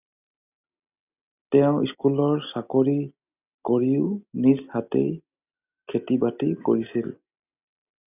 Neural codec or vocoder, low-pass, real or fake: none; 3.6 kHz; real